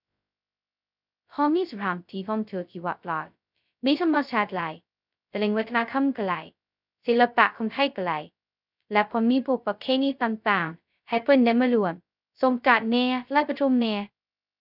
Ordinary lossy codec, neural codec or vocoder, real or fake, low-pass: none; codec, 16 kHz, 0.2 kbps, FocalCodec; fake; 5.4 kHz